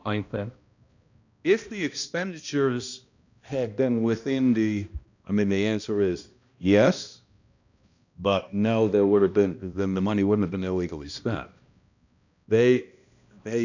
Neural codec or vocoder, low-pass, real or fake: codec, 16 kHz, 1 kbps, X-Codec, HuBERT features, trained on balanced general audio; 7.2 kHz; fake